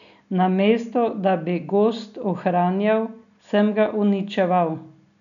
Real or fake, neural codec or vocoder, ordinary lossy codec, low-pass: real; none; none; 7.2 kHz